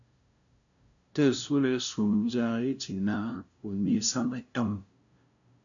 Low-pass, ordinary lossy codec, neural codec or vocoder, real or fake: 7.2 kHz; MP3, 96 kbps; codec, 16 kHz, 0.5 kbps, FunCodec, trained on LibriTTS, 25 frames a second; fake